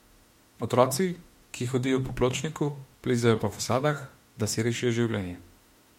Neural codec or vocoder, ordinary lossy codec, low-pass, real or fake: autoencoder, 48 kHz, 32 numbers a frame, DAC-VAE, trained on Japanese speech; MP3, 64 kbps; 19.8 kHz; fake